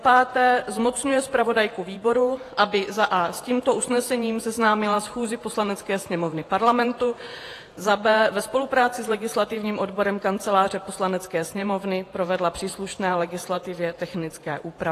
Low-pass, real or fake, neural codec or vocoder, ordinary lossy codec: 14.4 kHz; fake; vocoder, 48 kHz, 128 mel bands, Vocos; AAC, 48 kbps